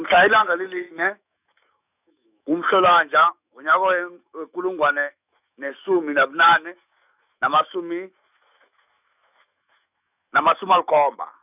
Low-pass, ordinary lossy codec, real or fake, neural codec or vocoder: 3.6 kHz; none; fake; autoencoder, 48 kHz, 128 numbers a frame, DAC-VAE, trained on Japanese speech